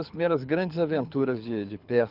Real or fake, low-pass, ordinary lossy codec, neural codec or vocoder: fake; 5.4 kHz; Opus, 32 kbps; codec, 24 kHz, 6 kbps, HILCodec